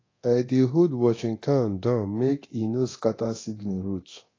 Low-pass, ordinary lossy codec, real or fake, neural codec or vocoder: 7.2 kHz; AAC, 32 kbps; fake; codec, 24 kHz, 0.9 kbps, DualCodec